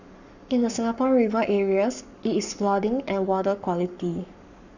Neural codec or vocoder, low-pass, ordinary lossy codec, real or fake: codec, 44.1 kHz, 7.8 kbps, Pupu-Codec; 7.2 kHz; none; fake